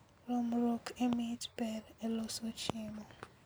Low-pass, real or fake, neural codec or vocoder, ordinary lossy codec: none; real; none; none